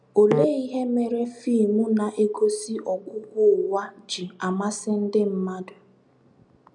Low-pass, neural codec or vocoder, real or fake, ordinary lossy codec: 9.9 kHz; none; real; none